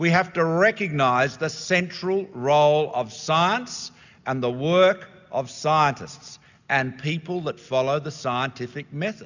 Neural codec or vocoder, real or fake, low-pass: none; real; 7.2 kHz